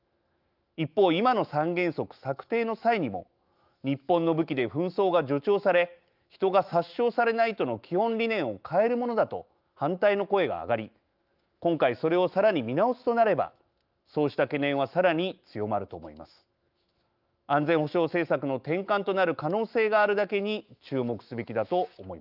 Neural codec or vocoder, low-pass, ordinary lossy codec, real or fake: autoencoder, 48 kHz, 128 numbers a frame, DAC-VAE, trained on Japanese speech; 5.4 kHz; Opus, 24 kbps; fake